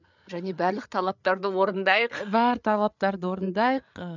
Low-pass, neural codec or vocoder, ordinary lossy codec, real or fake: 7.2 kHz; codec, 16 kHz, 8 kbps, FreqCodec, larger model; none; fake